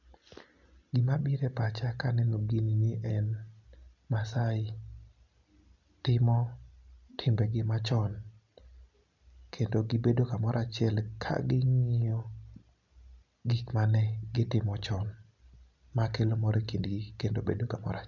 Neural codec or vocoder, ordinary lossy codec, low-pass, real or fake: none; none; 7.2 kHz; real